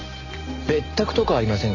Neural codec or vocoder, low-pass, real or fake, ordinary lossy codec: none; 7.2 kHz; real; Opus, 64 kbps